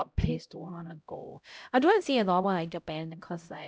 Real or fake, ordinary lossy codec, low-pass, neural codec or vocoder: fake; none; none; codec, 16 kHz, 0.5 kbps, X-Codec, HuBERT features, trained on LibriSpeech